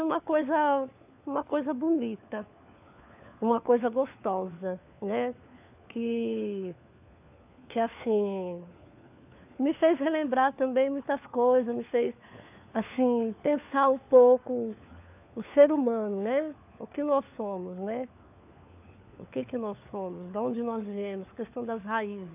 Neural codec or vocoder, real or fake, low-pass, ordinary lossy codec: codec, 16 kHz, 4 kbps, FunCodec, trained on LibriTTS, 50 frames a second; fake; 3.6 kHz; AAC, 32 kbps